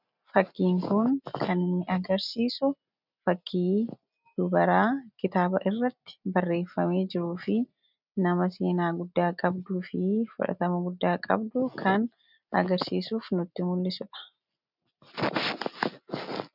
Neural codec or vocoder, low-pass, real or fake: none; 5.4 kHz; real